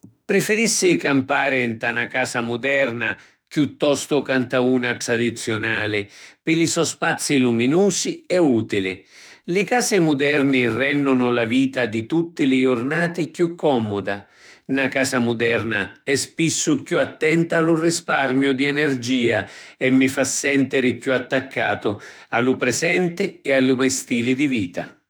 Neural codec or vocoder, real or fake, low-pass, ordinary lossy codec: autoencoder, 48 kHz, 32 numbers a frame, DAC-VAE, trained on Japanese speech; fake; none; none